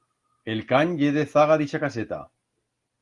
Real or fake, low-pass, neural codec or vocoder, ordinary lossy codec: real; 10.8 kHz; none; Opus, 24 kbps